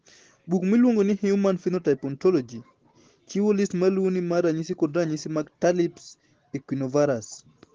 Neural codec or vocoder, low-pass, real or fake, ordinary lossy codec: none; 7.2 kHz; real; Opus, 16 kbps